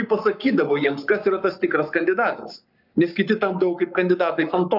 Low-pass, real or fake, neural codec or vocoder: 5.4 kHz; fake; codec, 44.1 kHz, 7.8 kbps, Pupu-Codec